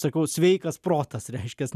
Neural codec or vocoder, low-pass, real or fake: none; 14.4 kHz; real